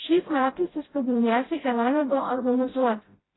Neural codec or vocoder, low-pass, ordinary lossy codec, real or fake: codec, 16 kHz, 0.5 kbps, FreqCodec, smaller model; 7.2 kHz; AAC, 16 kbps; fake